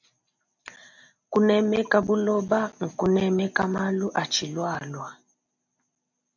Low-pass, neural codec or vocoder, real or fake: 7.2 kHz; none; real